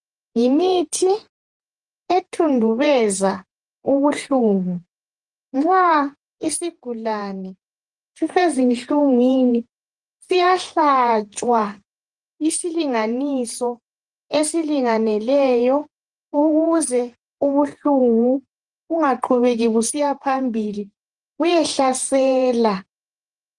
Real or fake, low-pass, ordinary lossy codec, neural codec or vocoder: fake; 10.8 kHz; Opus, 24 kbps; vocoder, 48 kHz, 128 mel bands, Vocos